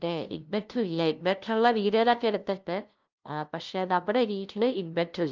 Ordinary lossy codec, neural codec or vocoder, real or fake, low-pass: Opus, 24 kbps; codec, 16 kHz, 0.5 kbps, FunCodec, trained on LibriTTS, 25 frames a second; fake; 7.2 kHz